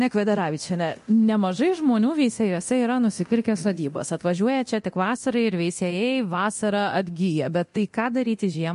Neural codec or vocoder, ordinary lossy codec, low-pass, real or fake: codec, 24 kHz, 0.9 kbps, DualCodec; MP3, 48 kbps; 10.8 kHz; fake